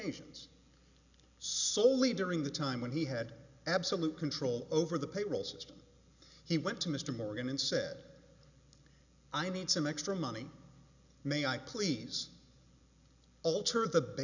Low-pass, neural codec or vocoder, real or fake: 7.2 kHz; none; real